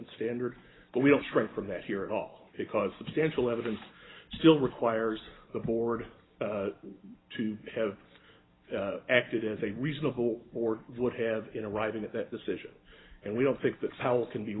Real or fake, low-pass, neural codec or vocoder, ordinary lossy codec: real; 7.2 kHz; none; AAC, 16 kbps